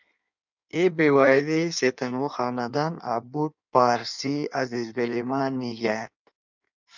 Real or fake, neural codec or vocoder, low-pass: fake; codec, 16 kHz in and 24 kHz out, 1.1 kbps, FireRedTTS-2 codec; 7.2 kHz